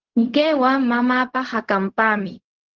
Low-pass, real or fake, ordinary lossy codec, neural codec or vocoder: 7.2 kHz; fake; Opus, 16 kbps; codec, 16 kHz, 0.4 kbps, LongCat-Audio-Codec